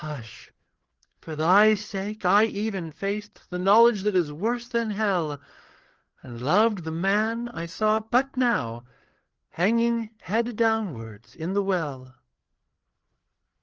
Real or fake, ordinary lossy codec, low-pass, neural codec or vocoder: fake; Opus, 16 kbps; 7.2 kHz; codec, 16 kHz, 4 kbps, FreqCodec, larger model